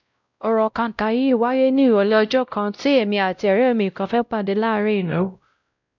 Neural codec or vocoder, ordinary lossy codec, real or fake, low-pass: codec, 16 kHz, 0.5 kbps, X-Codec, WavLM features, trained on Multilingual LibriSpeech; none; fake; 7.2 kHz